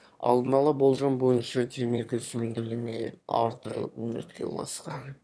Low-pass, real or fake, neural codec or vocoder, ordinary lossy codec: none; fake; autoencoder, 22.05 kHz, a latent of 192 numbers a frame, VITS, trained on one speaker; none